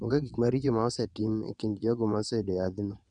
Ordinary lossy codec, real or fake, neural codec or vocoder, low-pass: none; fake; vocoder, 22.05 kHz, 80 mel bands, WaveNeXt; 9.9 kHz